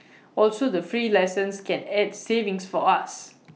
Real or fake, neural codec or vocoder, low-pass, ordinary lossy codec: real; none; none; none